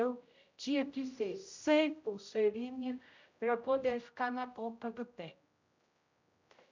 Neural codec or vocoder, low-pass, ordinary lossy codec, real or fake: codec, 16 kHz, 0.5 kbps, X-Codec, HuBERT features, trained on general audio; 7.2 kHz; MP3, 64 kbps; fake